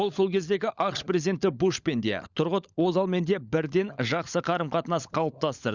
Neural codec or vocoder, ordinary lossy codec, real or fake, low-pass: codec, 16 kHz, 16 kbps, FunCodec, trained on LibriTTS, 50 frames a second; Opus, 64 kbps; fake; 7.2 kHz